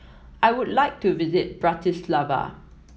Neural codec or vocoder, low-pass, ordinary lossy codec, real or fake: none; none; none; real